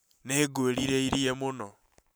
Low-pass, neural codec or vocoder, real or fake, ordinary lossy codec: none; vocoder, 44.1 kHz, 128 mel bands every 512 samples, BigVGAN v2; fake; none